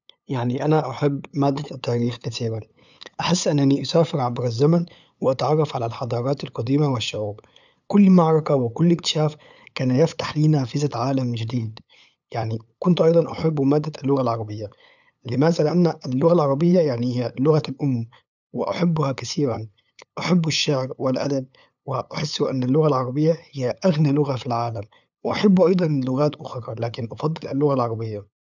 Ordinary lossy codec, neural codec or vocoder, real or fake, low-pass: none; codec, 16 kHz, 8 kbps, FunCodec, trained on LibriTTS, 25 frames a second; fake; 7.2 kHz